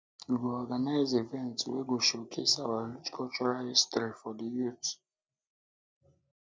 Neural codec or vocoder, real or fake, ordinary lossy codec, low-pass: none; real; none; none